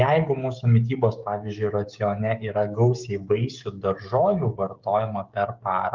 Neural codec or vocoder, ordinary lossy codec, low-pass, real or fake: codec, 16 kHz, 16 kbps, FreqCodec, smaller model; Opus, 24 kbps; 7.2 kHz; fake